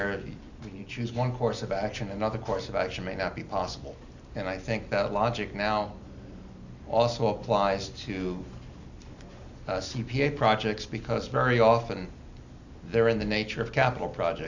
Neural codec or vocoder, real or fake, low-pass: none; real; 7.2 kHz